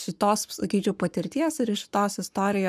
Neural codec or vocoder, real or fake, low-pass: codec, 44.1 kHz, 7.8 kbps, Pupu-Codec; fake; 14.4 kHz